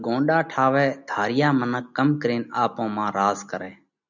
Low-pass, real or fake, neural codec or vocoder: 7.2 kHz; real; none